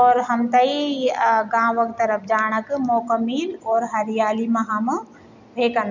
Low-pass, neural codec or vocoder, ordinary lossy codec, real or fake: 7.2 kHz; none; none; real